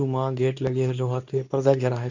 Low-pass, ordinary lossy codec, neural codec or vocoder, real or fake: 7.2 kHz; none; codec, 24 kHz, 0.9 kbps, WavTokenizer, medium speech release version 2; fake